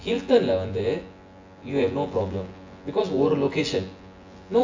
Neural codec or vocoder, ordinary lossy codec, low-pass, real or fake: vocoder, 24 kHz, 100 mel bands, Vocos; AAC, 48 kbps; 7.2 kHz; fake